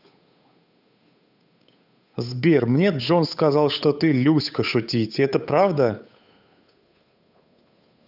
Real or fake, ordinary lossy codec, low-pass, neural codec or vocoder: fake; none; 5.4 kHz; codec, 16 kHz, 8 kbps, FunCodec, trained on Chinese and English, 25 frames a second